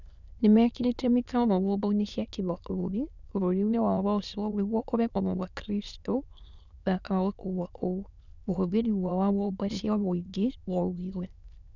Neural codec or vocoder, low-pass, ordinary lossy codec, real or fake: autoencoder, 22.05 kHz, a latent of 192 numbers a frame, VITS, trained on many speakers; 7.2 kHz; none; fake